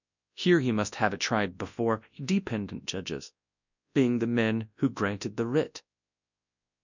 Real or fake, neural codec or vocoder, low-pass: fake; codec, 24 kHz, 0.9 kbps, WavTokenizer, large speech release; 7.2 kHz